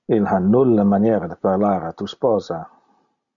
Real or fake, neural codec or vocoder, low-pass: real; none; 7.2 kHz